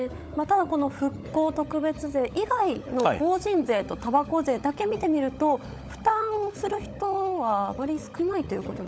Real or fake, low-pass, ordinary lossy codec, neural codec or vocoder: fake; none; none; codec, 16 kHz, 16 kbps, FunCodec, trained on LibriTTS, 50 frames a second